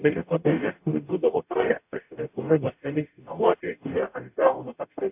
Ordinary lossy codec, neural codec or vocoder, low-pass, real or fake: AAC, 32 kbps; codec, 44.1 kHz, 0.9 kbps, DAC; 3.6 kHz; fake